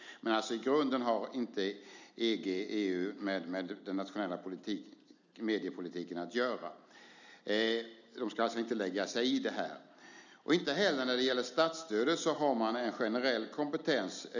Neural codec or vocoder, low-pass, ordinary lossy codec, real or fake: none; 7.2 kHz; none; real